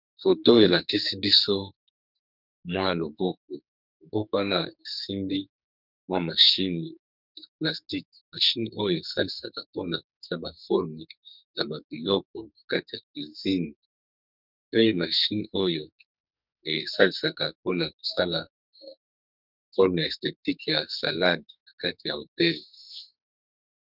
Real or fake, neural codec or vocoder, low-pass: fake; codec, 44.1 kHz, 2.6 kbps, SNAC; 5.4 kHz